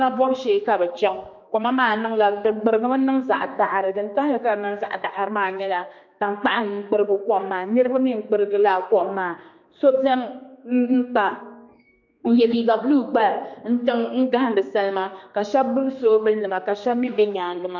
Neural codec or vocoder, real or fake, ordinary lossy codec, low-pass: codec, 16 kHz, 2 kbps, X-Codec, HuBERT features, trained on general audio; fake; MP3, 48 kbps; 7.2 kHz